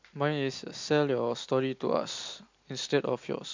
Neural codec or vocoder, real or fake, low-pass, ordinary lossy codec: none; real; 7.2 kHz; MP3, 64 kbps